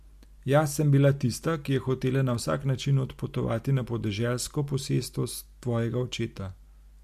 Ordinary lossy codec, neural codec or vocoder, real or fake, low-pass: MP3, 64 kbps; none; real; 14.4 kHz